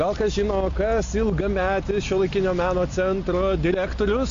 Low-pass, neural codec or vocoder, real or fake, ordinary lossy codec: 7.2 kHz; none; real; AAC, 64 kbps